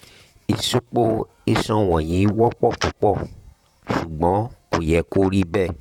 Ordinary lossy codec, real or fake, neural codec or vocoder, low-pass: none; fake; vocoder, 44.1 kHz, 128 mel bands, Pupu-Vocoder; 19.8 kHz